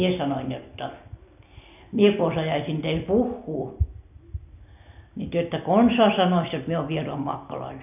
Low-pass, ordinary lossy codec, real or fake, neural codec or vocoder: 3.6 kHz; none; real; none